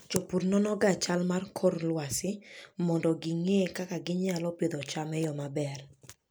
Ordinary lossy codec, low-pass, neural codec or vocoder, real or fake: none; none; none; real